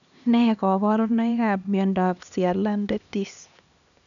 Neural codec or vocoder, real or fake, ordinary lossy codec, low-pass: codec, 16 kHz, 2 kbps, X-Codec, HuBERT features, trained on LibriSpeech; fake; none; 7.2 kHz